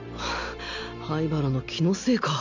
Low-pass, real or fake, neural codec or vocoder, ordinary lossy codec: 7.2 kHz; real; none; none